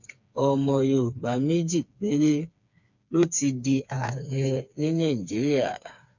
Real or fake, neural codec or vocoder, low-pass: fake; codec, 16 kHz, 4 kbps, FreqCodec, smaller model; 7.2 kHz